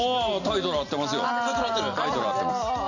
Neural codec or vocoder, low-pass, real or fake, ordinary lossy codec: none; 7.2 kHz; real; none